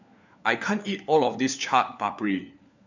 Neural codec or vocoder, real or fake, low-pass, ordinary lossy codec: codec, 16 kHz, 4 kbps, FunCodec, trained on LibriTTS, 50 frames a second; fake; 7.2 kHz; none